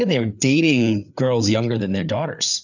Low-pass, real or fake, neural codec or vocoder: 7.2 kHz; fake; codec, 16 kHz, 4 kbps, FreqCodec, larger model